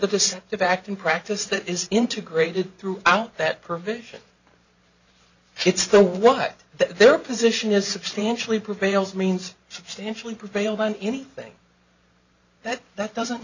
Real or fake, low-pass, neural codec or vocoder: fake; 7.2 kHz; vocoder, 44.1 kHz, 128 mel bands every 512 samples, BigVGAN v2